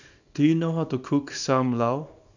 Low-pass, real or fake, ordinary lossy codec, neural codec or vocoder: 7.2 kHz; fake; none; codec, 24 kHz, 0.9 kbps, WavTokenizer, small release